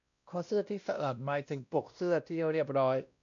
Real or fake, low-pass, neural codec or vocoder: fake; 7.2 kHz; codec, 16 kHz, 1 kbps, X-Codec, WavLM features, trained on Multilingual LibriSpeech